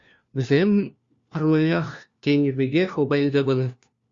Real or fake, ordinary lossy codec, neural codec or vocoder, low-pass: fake; Opus, 64 kbps; codec, 16 kHz, 1 kbps, FunCodec, trained on LibriTTS, 50 frames a second; 7.2 kHz